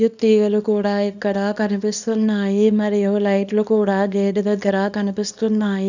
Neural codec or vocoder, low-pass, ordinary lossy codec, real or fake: codec, 24 kHz, 0.9 kbps, WavTokenizer, small release; 7.2 kHz; none; fake